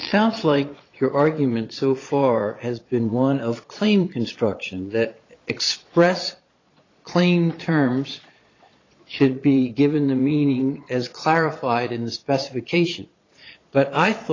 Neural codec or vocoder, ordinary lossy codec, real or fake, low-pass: vocoder, 22.05 kHz, 80 mel bands, Vocos; AAC, 32 kbps; fake; 7.2 kHz